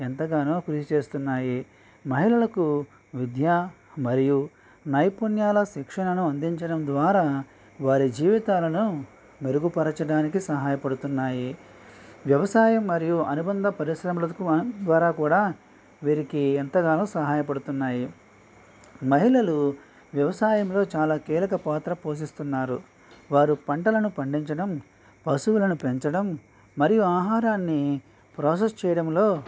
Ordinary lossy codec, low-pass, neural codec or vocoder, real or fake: none; none; none; real